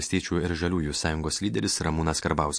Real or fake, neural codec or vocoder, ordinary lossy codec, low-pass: real; none; MP3, 48 kbps; 9.9 kHz